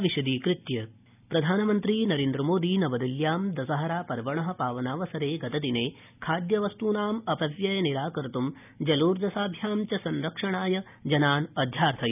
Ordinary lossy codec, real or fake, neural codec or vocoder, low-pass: none; real; none; 3.6 kHz